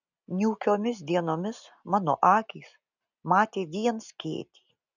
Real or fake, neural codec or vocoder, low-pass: real; none; 7.2 kHz